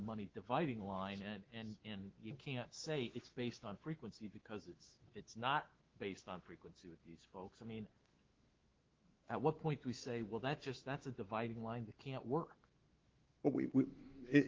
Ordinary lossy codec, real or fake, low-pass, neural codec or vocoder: Opus, 16 kbps; fake; 7.2 kHz; autoencoder, 48 kHz, 128 numbers a frame, DAC-VAE, trained on Japanese speech